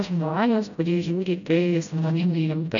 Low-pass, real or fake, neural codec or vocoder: 7.2 kHz; fake; codec, 16 kHz, 0.5 kbps, FreqCodec, smaller model